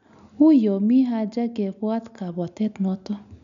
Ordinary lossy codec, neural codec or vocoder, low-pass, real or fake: none; none; 7.2 kHz; real